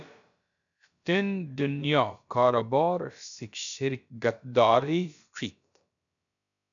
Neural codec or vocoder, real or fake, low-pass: codec, 16 kHz, about 1 kbps, DyCAST, with the encoder's durations; fake; 7.2 kHz